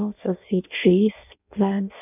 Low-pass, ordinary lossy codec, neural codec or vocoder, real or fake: 3.6 kHz; none; codec, 16 kHz in and 24 kHz out, 0.6 kbps, FireRedTTS-2 codec; fake